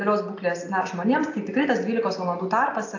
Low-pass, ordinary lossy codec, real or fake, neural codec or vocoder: 7.2 kHz; AAC, 48 kbps; real; none